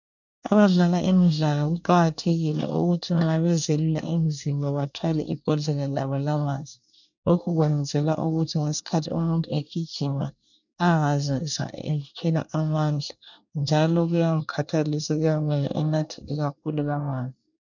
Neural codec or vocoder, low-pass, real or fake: codec, 24 kHz, 1 kbps, SNAC; 7.2 kHz; fake